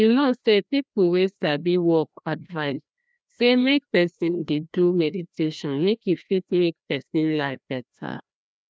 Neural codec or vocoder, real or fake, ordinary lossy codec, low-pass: codec, 16 kHz, 1 kbps, FreqCodec, larger model; fake; none; none